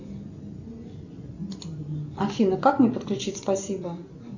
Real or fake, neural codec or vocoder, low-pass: real; none; 7.2 kHz